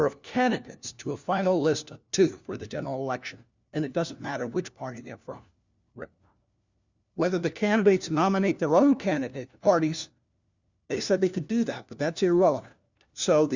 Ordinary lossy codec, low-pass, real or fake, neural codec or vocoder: Opus, 64 kbps; 7.2 kHz; fake; codec, 16 kHz, 1 kbps, FunCodec, trained on LibriTTS, 50 frames a second